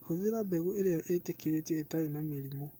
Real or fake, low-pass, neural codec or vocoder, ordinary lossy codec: fake; none; codec, 44.1 kHz, 7.8 kbps, DAC; none